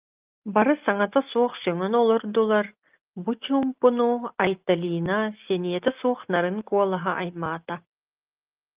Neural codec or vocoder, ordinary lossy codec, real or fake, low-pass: none; Opus, 24 kbps; real; 3.6 kHz